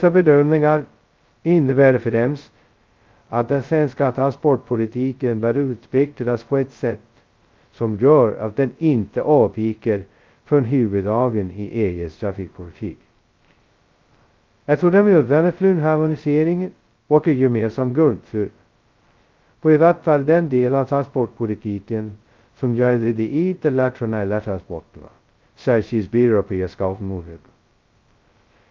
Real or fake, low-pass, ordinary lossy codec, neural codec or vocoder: fake; 7.2 kHz; Opus, 24 kbps; codec, 16 kHz, 0.2 kbps, FocalCodec